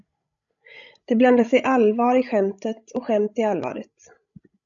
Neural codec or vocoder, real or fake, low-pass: codec, 16 kHz, 16 kbps, FreqCodec, larger model; fake; 7.2 kHz